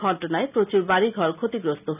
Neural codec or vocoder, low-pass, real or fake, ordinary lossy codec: none; 3.6 kHz; real; none